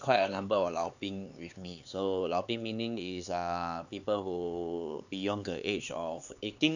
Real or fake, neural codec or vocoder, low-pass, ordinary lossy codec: fake; codec, 16 kHz, 4 kbps, X-Codec, HuBERT features, trained on LibriSpeech; 7.2 kHz; none